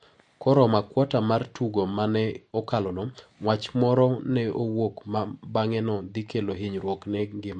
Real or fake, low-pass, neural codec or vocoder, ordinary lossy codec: real; 10.8 kHz; none; MP3, 48 kbps